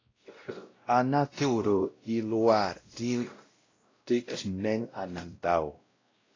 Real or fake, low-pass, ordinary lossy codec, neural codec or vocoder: fake; 7.2 kHz; AAC, 32 kbps; codec, 16 kHz, 0.5 kbps, X-Codec, WavLM features, trained on Multilingual LibriSpeech